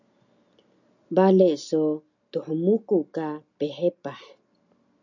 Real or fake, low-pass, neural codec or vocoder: real; 7.2 kHz; none